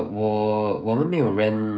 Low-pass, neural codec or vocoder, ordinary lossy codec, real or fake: none; codec, 16 kHz, 16 kbps, FreqCodec, smaller model; none; fake